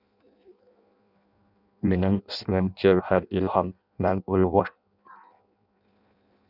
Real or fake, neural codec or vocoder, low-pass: fake; codec, 16 kHz in and 24 kHz out, 0.6 kbps, FireRedTTS-2 codec; 5.4 kHz